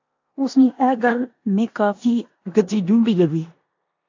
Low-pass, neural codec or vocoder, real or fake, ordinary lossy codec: 7.2 kHz; codec, 16 kHz in and 24 kHz out, 0.9 kbps, LongCat-Audio-Codec, four codebook decoder; fake; AAC, 48 kbps